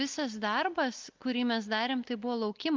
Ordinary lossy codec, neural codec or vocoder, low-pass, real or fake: Opus, 32 kbps; none; 7.2 kHz; real